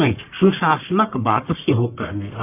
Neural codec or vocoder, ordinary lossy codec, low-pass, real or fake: codec, 44.1 kHz, 1.7 kbps, Pupu-Codec; AAC, 24 kbps; 3.6 kHz; fake